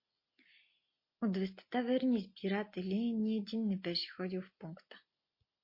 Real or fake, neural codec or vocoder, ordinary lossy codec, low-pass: real; none; MP3, 32 kbps; 5.4 kHz